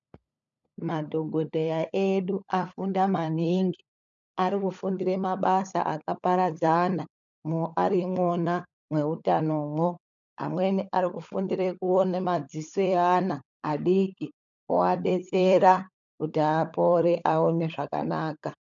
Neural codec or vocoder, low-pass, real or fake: codec, 16 kHz, 16 kbps, FunCodec, trained on LibriTTS, 50 frames a second; 7.2 kHz; fake